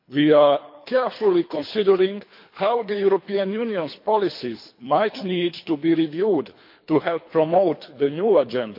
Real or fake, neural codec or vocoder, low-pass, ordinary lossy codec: fake; codec, 24 kHz, 3 kbps, HILCodec; 5.4 kHz; MP3, 32 kbps